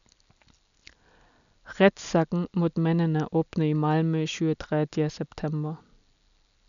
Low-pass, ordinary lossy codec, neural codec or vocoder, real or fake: 7.2 kHz; none; none; real